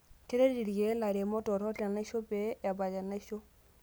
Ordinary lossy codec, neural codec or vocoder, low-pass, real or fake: none; none; none; real